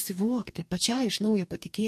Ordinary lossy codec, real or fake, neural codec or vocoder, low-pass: MP3, 64 kbps; fake; codec, 44.1 kHz, 2.6 kbps, DAC; 14.4 kHz